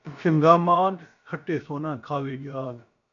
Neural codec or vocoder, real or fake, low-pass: codec, 16 kHz, about 1 kbps, DyCAST, with the encoder's durations; fake; 7.2 kHz